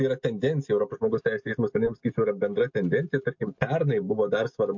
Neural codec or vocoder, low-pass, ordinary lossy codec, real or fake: none; 7.2 kHz; MP3, 48 kbps; real